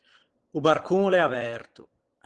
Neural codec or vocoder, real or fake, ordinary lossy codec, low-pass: vocoder, 22.05 kHz, 80 mel bands, Vocos; fake; Opus, 16 kbps; 9.9 kHz